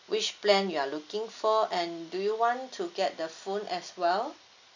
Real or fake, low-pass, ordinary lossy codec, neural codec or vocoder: real; 7.2 kHz; none; none